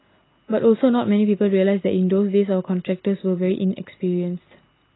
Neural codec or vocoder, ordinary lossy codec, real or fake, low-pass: none; AAC, 16 kbps; real; 7.2 kHz